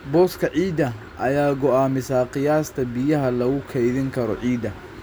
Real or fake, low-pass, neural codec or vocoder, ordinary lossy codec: real; none; none; none